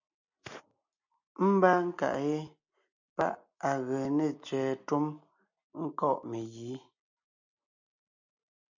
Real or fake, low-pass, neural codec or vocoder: real; 7.2 kHz; none